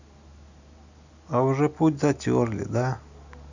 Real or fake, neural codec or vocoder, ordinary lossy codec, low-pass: real; none; none; 7.2 kHz